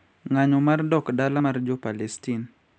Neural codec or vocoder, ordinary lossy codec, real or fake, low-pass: none; none; real; none